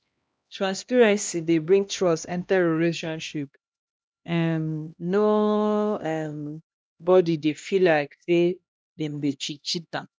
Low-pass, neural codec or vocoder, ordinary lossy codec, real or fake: none; codec, 16 kHz, 1 kbps, X-Codec, HuBERT features, trained on LibriSpeech; none; fake